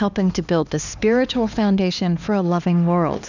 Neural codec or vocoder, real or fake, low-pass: codec, 16 kHz, 2 kbps, X-Codec, HuBERT features, trained on LibriSpeech; fake; 7.2 kHz